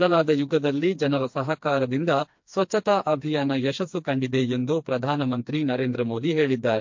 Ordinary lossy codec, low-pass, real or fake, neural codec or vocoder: MP3, 48 kbps; 7.2 kHz; fake; codec, 16 kHz, 2 kbps, FreqCodec, smaller model